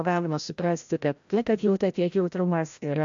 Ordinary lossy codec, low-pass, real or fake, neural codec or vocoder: AAC, 64 kbps; 7.2 kHz; fake; codec, 16 kHz, 0.5 kbps, FreqCodec, larger model